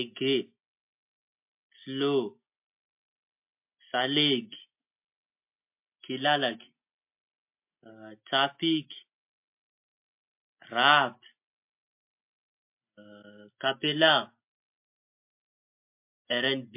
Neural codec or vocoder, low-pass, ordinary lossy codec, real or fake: none; 3.6 kHz; MP3, 32 kbps; real